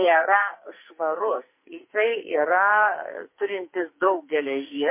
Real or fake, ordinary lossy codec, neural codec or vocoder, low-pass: fake; MP3, 24 kbps; codec, 44.1 kHz, 2.6 kbps, SNAC; 3.6 kHz